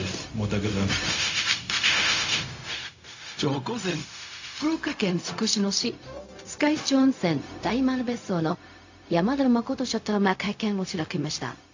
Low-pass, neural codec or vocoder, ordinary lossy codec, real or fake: 7.2 kHz; codec, 16 kHz, 0.4 kbps, LongCat-Audio-Codec; AAC, 48 kbps; fake